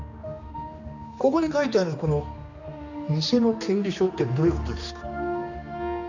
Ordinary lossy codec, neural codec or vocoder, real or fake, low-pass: none; codec, 16 kHz, 2 kbps, X-Codec, HuBERT features, trained on general audio; fake; 7.2 kHz